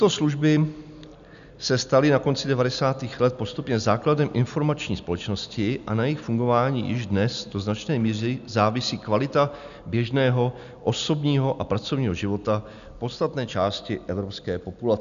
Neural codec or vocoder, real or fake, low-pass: none; real; 7.2 kHz